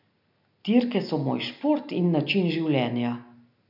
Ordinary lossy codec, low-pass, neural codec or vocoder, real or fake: none; 5.4 kHz; none; real